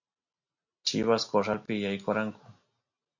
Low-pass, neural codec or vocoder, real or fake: 7.2 kHz; none; real